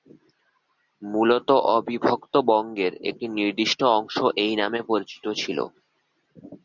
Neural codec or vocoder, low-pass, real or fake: none; 7.2 kHz; real